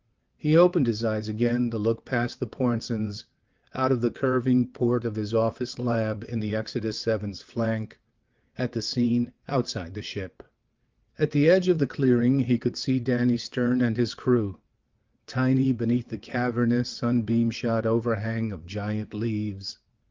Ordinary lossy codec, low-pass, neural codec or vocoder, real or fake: Opus, 32 kbps; 7.2 kHz; vocoder, 22.05 kHz, 80 mel bands, WaveNeXt; fake